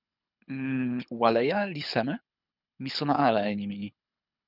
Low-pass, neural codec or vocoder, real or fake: 5.4 kHz; codec, 24 kHz, 6 kbps, HILCodec; fake